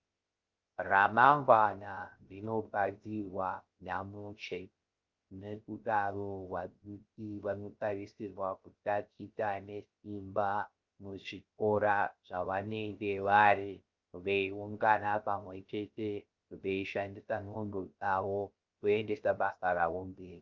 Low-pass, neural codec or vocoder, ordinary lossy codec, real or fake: 7.2 kHz; codec, 16 kHz, 0.3 kbps, FocalCodec; Opus, 32 kbps; fake